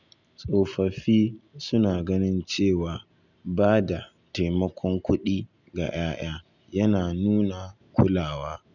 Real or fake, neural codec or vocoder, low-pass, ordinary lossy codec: real; none; 7.2 kHz; none